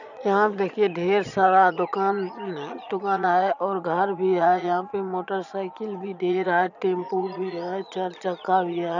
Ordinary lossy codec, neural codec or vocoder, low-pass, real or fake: none; vocoder, 22.05 kHz, 80 mel bands, Vocos; 7.2 kHz; fake